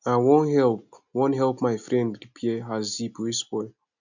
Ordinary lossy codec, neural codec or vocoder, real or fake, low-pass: none; none; real; 7.2 kHz